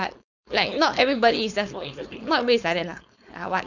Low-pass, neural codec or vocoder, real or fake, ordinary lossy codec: 7.2 kHz; codec, 16 kHz, 4.8 kbps, FACodec; fake; none